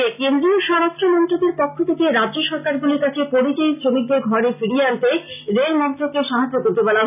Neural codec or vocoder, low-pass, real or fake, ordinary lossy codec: none; 3.6 kHz; real; none